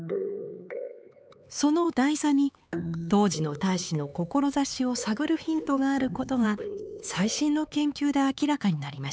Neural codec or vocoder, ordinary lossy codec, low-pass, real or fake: codec, 16 kHz, 4 kbps, X-Codec, HuBERT features, trained on LibriSpeech; none; none; fake